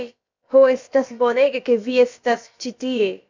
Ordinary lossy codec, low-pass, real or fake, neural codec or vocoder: MP3, 64 kbps; 7.2 kHz; fake; codec, 16 kHz, about 1 kbps, DyCAST, with the encoder's durations